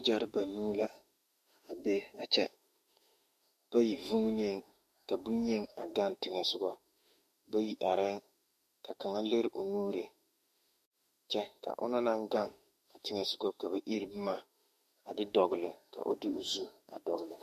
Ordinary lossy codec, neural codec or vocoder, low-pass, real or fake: MP3, 64 kbps; autoencoder, 48 kHz, 32 numbers a frame, DAC-VAE, trained on Japanese speech; 14.4 kHz; fake